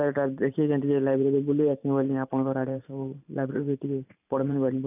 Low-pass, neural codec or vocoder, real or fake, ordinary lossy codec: 3.6 kHz; vocoder, 44.1 kHz, 128 mel bands every 512 samples, BigVGAN v2; fake; none